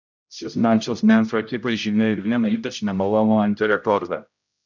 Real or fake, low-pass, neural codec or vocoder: fake; 7.2 kHz; codec, 16 kHz, 0.5 kbps, X-Codec, HuBERT features, trained on general audio